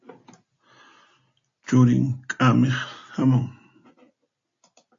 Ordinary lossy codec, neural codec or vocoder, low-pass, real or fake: AAC, 48 kbps; none; 7.2 kHz; real